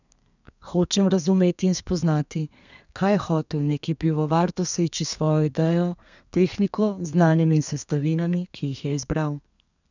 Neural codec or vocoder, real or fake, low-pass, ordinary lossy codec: codec, 32 kHz, 1.9 kbps, SNAC; fake; 7.2 kHz; none